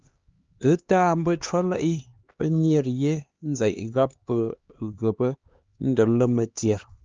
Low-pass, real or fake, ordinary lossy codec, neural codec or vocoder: 7.2 kHz; fake; Opus, 24 kbps; codec, 16 kHz, 2 kbps, X-Codec, HuBERT features, trained on LibriSpeech